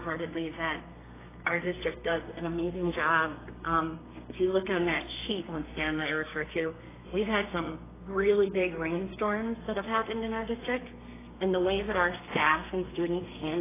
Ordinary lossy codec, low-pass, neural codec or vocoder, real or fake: AAC, 16 kbps; 3.6 kHz; codec, 32 kHz, 1.9 kbps, SNAC; fake